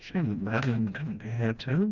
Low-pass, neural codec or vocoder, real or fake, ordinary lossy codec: 7.2 kHz; codec, 16 kHz, 1 kbps, FreqCodec, smaller model; fake; Opus, 64 kbps